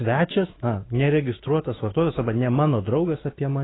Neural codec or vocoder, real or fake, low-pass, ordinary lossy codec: autoencoder, 48 kHz, 128 numbers a frame, DAC-VAE, trained on Japanese speech; fake; 7.2 kHz; AAC, 16 kbps